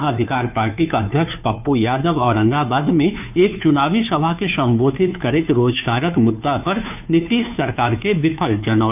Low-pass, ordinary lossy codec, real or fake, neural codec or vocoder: 3.6 kHz; none; fake; codec, 16 kHz, 2 kbps, FunCodec, trained on Chinese and English, 25 frames a second